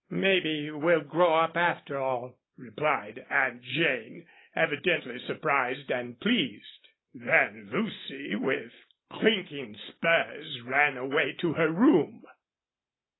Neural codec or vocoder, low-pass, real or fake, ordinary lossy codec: none; 7.2 kHz; real; AAC, 16 kbps